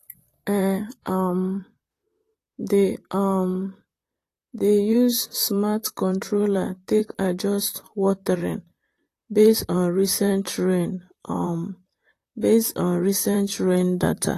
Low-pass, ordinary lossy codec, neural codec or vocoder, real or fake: 14.4 kHz; AAC, 48 kbps; vocoder, 44.1 kHz, 128 mel bands, Pupu-Vocoder; fake